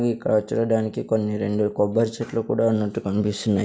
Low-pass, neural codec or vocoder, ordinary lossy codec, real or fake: none; none; none; real